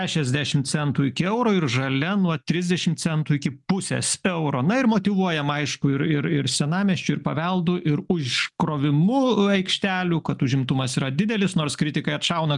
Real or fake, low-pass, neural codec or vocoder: real; 10.8 kHz; none